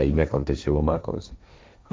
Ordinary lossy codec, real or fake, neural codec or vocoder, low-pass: none; fake; codec, 16 kHz, 1.1 kbps, Voila-Tokenizer; none